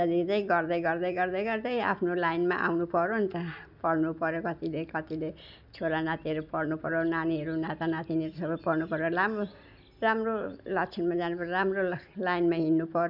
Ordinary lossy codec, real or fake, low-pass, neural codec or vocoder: none; real; 5.4 kHz; none